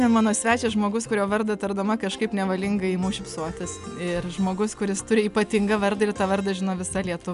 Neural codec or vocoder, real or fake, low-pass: none; real; 10.8 kHz